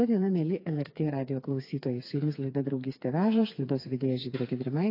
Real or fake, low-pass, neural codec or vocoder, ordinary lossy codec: fake; 5.4 kHz; codec, 16 kHz, 4 kbps, FreqCodec, smaller model; AAC, 32 kbps